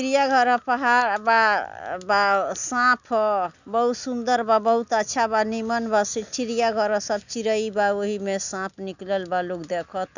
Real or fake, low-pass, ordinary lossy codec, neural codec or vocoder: real; 7.2 kHz; none; none